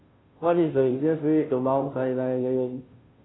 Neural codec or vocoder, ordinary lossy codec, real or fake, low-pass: codec, 16 kHz, 0.5 kbps, FunCodec, trained on Chinese and English, 25 frames a second; AAC, 16 kbps; fake; 7.2 kHz